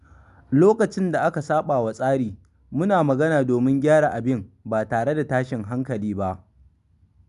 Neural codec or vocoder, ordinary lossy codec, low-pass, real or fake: none; none; 10.8 kHz; real